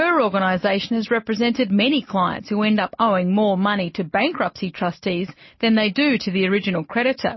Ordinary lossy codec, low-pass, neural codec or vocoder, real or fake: MP3, 24 kbps; 7.2 kHz; none; real